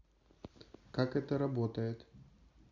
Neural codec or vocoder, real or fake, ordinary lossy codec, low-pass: none; real; none; 7.2 kHz